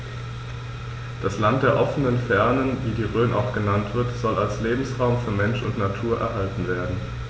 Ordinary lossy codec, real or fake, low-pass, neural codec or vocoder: none; real; none; none